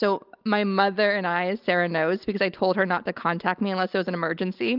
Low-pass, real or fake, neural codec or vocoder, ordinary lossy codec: 5.4 kHz; real; none; Opus, 24 kbps